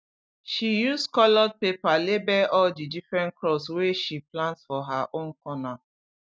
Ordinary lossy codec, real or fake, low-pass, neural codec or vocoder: none; real; none; none